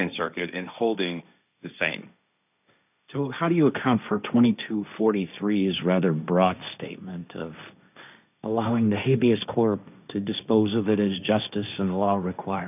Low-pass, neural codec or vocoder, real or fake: 3.6 kHz; codec, 16 kHz, 1.1 kbps, Voila-Tokenizer; fake